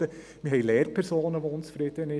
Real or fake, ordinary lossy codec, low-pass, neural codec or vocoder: real; none; none; none